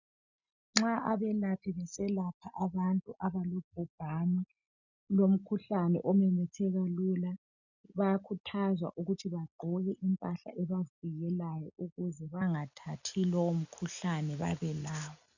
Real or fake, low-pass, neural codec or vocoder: real; 7.2 kHz; none